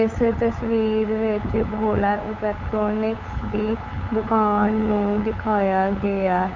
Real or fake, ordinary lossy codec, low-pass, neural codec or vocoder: fake; MP3, 64 kbps; 7.2 kHz; codec, 16 kHz, 8 kbps, FunCodec, trained on LibriTTS, 25 frames a second